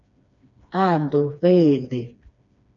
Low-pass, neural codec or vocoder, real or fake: 7.2 kHz; codec, 16 kHz, 2 kbps, FreqCodec, smaller model; fake